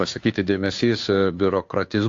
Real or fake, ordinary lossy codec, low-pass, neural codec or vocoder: fake; AAC, 48 kbps; 7.2 kHz; codec, 16 kHz, 2 kbps, FunCodec, trained on Chinese and English, 25 frames a second